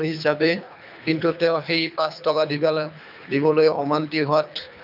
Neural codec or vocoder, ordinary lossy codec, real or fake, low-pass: codec, 24 kHz, 3 kbps, HILCodec; none; fake; 5.4 kHz